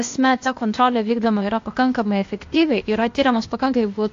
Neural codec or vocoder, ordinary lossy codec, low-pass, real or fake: codec, 16 kHz, 0.8 kbps, ZipCodec; AAC, 48 kbps; 7.2 kHz; fake